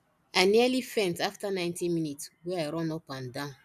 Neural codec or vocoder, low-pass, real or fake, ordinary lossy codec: none; 14.4 kHz; real; none